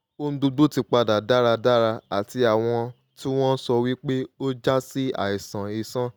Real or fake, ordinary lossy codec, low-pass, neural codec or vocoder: real; none; none; none